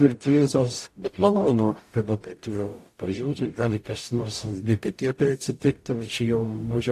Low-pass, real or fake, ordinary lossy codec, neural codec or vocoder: 14.4 kHz; fake; AAC, 64 kbps; codec, 44.1 kHz, 0.9 kbps, DAC